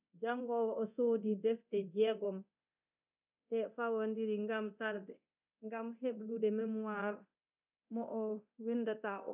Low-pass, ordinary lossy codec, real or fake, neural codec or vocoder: 3.6 kHz; none; fake; codec, 24 kHz, 0.9 kbps, DualCodec